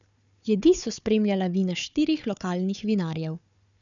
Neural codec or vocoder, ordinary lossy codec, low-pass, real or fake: codec, 16 kHz, 4 kbps, FunCodec, trained on Chinese and English, 50 frames a second; none; 7.2 kHz; fake